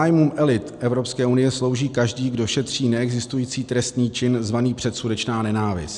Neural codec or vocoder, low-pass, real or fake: none; 10.8 kHz; real